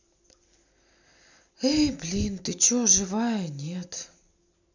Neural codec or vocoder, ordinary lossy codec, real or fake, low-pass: none; none; real; 7.2 kHz